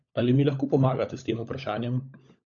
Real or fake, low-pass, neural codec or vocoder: fake; 7.2 kHz; codec, 16 kHz, 16 kbps, FunCodec, trained on LibriTTS, 50 frames a second